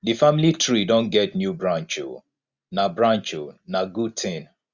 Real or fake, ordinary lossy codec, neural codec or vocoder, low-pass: real; Opus, 64 kbps; none; 7.2 kHz